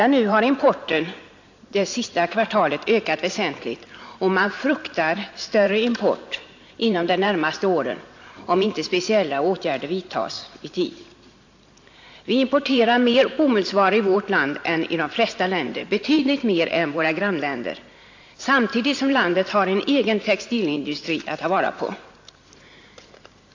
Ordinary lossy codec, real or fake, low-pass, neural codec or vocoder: AAC, 48 kbps; fake; 7.2 kHz; vocoder, 44.1 kHz, 128 mel bands every 512 samples, BigVGAN v2